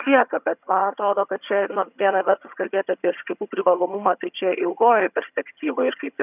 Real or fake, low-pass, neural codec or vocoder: fake; 3.6 kHz; vocoder, 22.05 kHz, 80 mel bands, HiFi-GAN